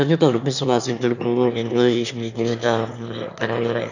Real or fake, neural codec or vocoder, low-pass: fake; autoencoder, 22.05 kHz, a latent of 192 numbers a frame, VITS, trained on one speaker; 7.2 kHz